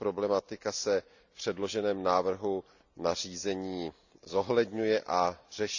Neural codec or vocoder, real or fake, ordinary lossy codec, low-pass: none; real; none; 7.2 kHz